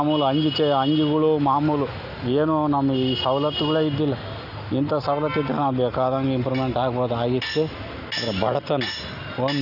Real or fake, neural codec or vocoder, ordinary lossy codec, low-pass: real; none; none; 5.4 kHz